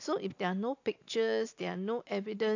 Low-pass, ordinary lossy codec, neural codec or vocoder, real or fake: 7.2 kHz; AAC, 48 kbps; none; real